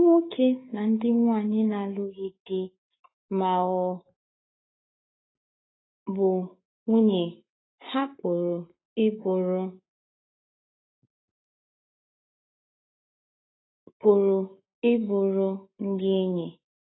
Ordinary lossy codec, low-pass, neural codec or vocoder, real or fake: AAC, 16 kbps; 7.2 kHz; codec, 44.1 kHz, 7.8 kbps, Pupu-Codec; fake